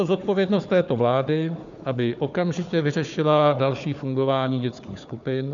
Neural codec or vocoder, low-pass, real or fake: codec, 16 kHz, 4 kbps, FunCodec, trained on Chinese and English, 50 frames a second; 7.2 kHz; fake